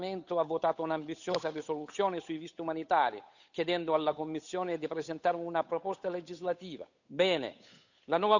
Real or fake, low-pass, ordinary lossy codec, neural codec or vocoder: fake; 7.2 kHz; none; codec, 16 kHz, 8 kbps, FunCodec, trained on Chinese and English, 25 frames a second